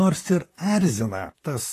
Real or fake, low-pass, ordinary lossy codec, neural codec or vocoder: fake; 14.4 kHz; AAC, 48 kbps; vocoder, 44.1 kHz, 128 mel bands, Pupu-Vocoder